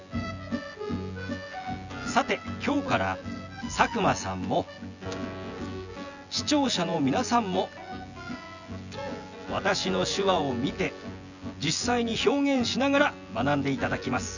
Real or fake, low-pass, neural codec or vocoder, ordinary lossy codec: fake; 7.2 kHz; vocoder, 24 kHz, 100 mel bands, Vocos; none